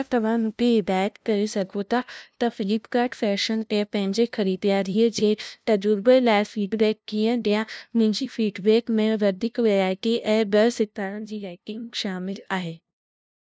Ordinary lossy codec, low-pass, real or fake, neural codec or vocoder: none; none; fake; codec, 16 kHz, 0.5 kbps, FunCodec, trained on LibriTTS, 25 frames a second